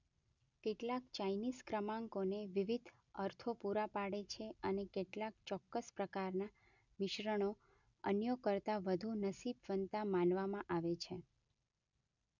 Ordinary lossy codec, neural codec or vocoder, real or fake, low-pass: none; none; real; 7.2 kHz